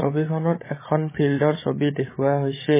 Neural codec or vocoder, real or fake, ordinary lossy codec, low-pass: codec, 16 kHz, 16 kbps, FreqCodec, larger model; fake; MP3, 16 kbps; 3.6 kHz